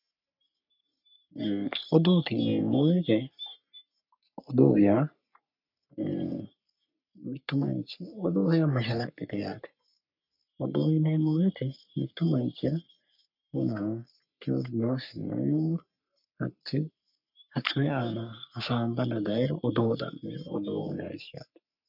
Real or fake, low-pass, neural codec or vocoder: fake; 5.4 kHz; codec, 44.1 kHz, 3.4 kbps, Pupu-Codec